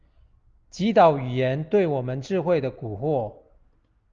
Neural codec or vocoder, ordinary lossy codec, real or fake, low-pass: none; Opus, 24 kbps; real; 7.2 kHz